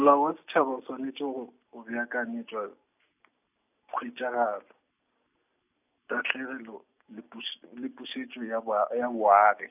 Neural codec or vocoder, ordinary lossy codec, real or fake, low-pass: none; none; real; 3.6 kHz